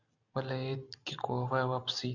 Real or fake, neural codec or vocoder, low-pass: real; none; 7.2 kHz